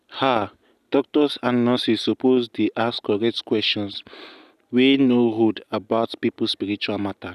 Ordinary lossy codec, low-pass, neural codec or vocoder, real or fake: none; 14.4 kHz; none; real